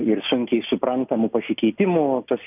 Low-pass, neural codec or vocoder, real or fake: 3.6 kHz; none; real